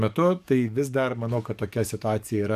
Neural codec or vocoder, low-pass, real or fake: codec, 44.1 kHz, 7.8 kbps, DAC; 14.4 kHz; fake